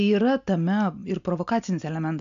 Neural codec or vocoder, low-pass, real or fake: none; 7.2 kHz; real